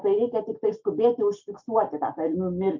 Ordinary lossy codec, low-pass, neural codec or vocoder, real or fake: MP3, 64 kbps; 7.2 kHz; none; real